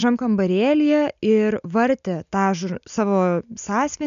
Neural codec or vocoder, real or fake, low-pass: none; real; 7.2 kHz